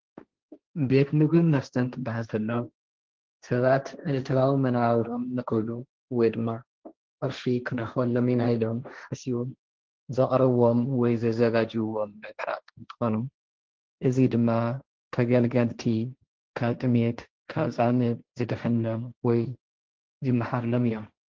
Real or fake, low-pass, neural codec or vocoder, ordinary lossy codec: fake; 7.2 kHz; codec, 16 kHz, 1.1 kbps, Voila-Tokenizer; Opus, 16 kbps